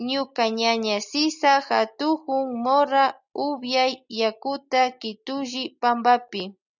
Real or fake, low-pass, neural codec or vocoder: real; 7.2 kHz; none